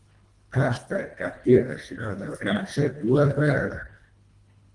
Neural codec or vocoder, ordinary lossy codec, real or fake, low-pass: codec, 24 kHz, 1.5 kbps, HILCodec; Opus, 24 kbps; fake; 10.8 kHz